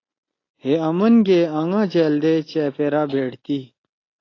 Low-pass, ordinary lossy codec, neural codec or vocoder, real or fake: 7.2 kHz; AAC, 32 kbps; none; real